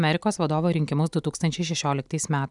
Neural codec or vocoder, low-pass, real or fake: none; 10.8 kHz; real